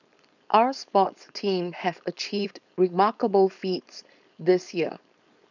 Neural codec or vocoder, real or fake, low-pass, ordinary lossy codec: codec, 16 kHz, 4.8 kbps, FACodec; fake; 7.2 kHz; none